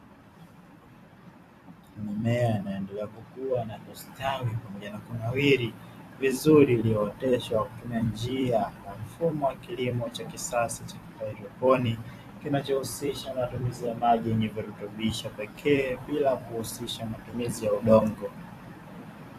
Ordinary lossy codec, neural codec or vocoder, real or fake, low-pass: AAC, 64 kbps; vocoder, 44.1 kHz, 128 mel bands every 256 samples, BigVGAN v2; fake; 14.4 kHz